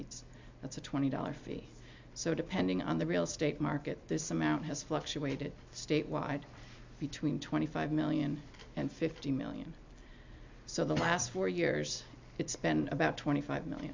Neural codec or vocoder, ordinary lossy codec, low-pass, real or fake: none; MP3, 64 kbps; 7.2 kHz; real